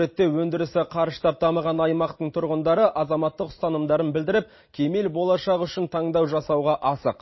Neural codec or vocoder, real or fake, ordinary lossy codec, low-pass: none; real; MP3, 24 kbps; 7.2 kHz